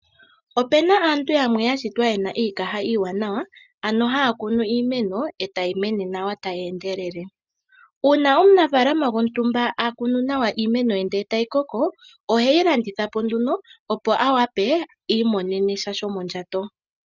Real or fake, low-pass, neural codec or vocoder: real; 7.2 kHz; none